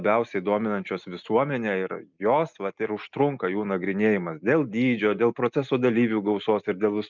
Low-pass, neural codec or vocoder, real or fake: 7.2 kHz; none; real